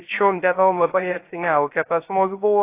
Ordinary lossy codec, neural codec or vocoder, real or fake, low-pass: AAC, 24 kbps; codec, 16 kHz, 0.3 kbps, FocalCodec; fake; 3.6 kHz